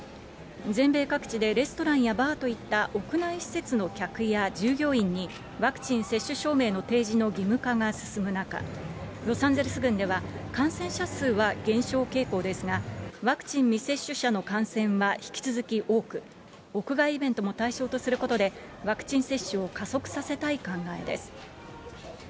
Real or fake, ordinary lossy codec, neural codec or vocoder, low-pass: real; none; none; none